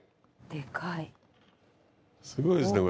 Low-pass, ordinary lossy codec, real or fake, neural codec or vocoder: none; none; real; none